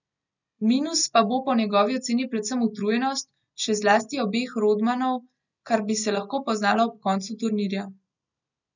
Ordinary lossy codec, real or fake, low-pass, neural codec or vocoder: none; real; 7.2 kHz; none